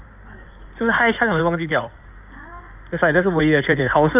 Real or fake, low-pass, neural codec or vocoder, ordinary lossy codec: fake; 3.6 kHz; codec, 16 kHz in and 24 kHz out, 2.2 kbps, FireRedTTS-2 codec; none